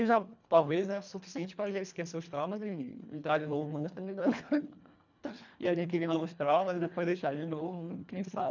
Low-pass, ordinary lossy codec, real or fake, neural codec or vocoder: 7.2 kHz; none; fake; codec, 24 kHz, 1.5 kbps, HILCodec